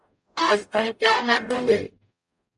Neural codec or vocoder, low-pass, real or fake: codec, 44.1 kHz, 0.9 kbps, DAC; 10.8 kHz; fake